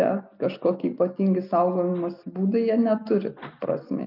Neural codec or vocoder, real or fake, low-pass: none; real; 5.4 kHz